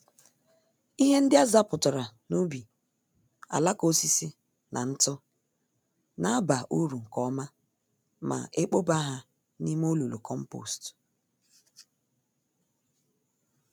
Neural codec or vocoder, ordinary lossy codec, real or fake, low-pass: none; none; real; none